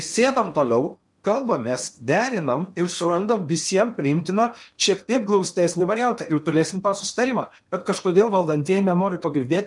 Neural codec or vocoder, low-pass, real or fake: codec, 16 kHz in and 24 kHz out, 0.8 kbps, FocalCodec, streaming, 65536 codes; 10.8 kHz; fake